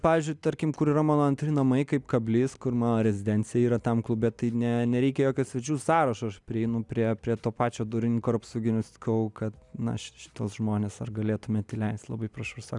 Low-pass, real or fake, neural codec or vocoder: 10.8 kHz; real; none